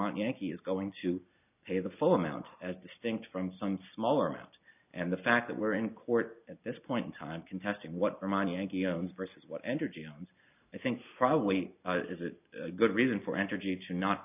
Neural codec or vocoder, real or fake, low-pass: none; real; 3.6 kHz